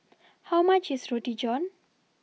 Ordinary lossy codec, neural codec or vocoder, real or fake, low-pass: none; none; real; none